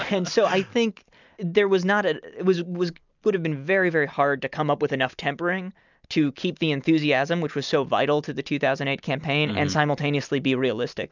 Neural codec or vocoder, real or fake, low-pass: none; real; 7.2 kHz